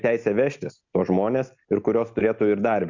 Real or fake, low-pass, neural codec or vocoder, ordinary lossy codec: real; 7.2 kHz; none; Opus, 64 kbps